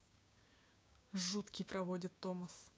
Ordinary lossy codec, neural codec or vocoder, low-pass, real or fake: none; codec, 16 kHz, 6 kbps, DAC; none; fake